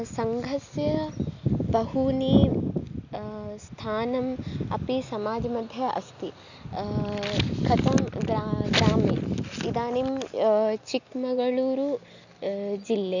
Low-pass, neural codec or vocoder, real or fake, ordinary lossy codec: 7.2 kHz; none; real; none